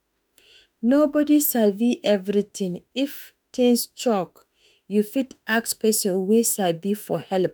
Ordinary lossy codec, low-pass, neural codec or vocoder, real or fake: none; none; autoencoder, 48 kHz, 32 numbers a frame, DAC-VAE, trained on Japanese speech; fake